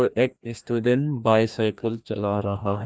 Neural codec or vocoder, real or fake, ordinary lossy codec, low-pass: codec, 16 kHz, 1 kbps, FreqCodec, larger model; fake; none; none